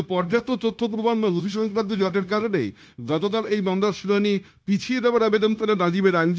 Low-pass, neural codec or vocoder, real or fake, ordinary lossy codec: none; codec, 16 kHz, 0.9 kbps, LongCat-Audio-Codec; fake; none